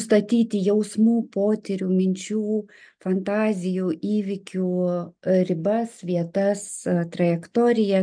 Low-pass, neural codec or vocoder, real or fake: 9.9 kHz; none; real